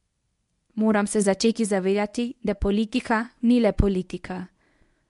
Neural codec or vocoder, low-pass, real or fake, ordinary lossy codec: codec, 24 kHz, 0.9 kbps, WavTokenizer, medium speech release version 1; 10.8 kHz; fake; MP3, 64 kbps